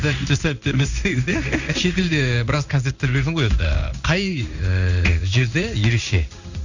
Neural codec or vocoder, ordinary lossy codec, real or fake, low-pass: codec, 16 kHz in and 24 kHz out, 1 kbps, XY-Tokenizer; none; fake; 7.2 kHz